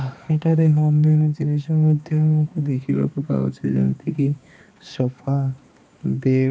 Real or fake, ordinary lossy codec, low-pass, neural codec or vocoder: fake; none; none; codec, 16 kHz, 2 kbps, X-Codec, HuBERT features, trained on balanced general audio